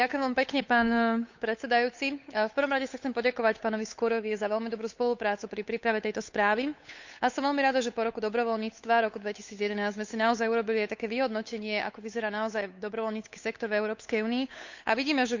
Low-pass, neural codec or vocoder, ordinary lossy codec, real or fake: 7.2 kHz; codec, 16 kHz, 8 kbps, FunCodec, trained on Chinese and English, 25 frames a second; Opus, 64 kbps; fake